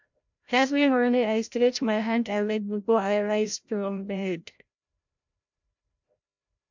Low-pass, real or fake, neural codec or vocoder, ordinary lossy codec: 7.2 kHz; fake; codec, 16 kHz, 0.5 kbps, FreqCodec, larger model; MP3, 64 kbps